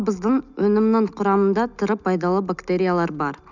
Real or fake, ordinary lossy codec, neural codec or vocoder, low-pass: real; none; none; 7.2 kHz